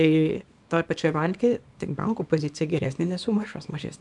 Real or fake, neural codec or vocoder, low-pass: fake; codec, 24 kHz, 0.9 kbps, WavTokenizer, small release; 10.8 kHz